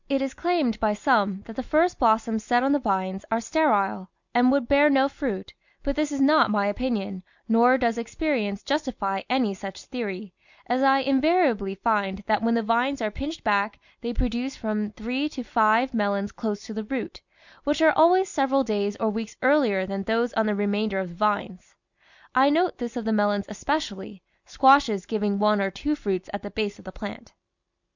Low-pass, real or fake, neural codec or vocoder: 7.2 kHz; real; none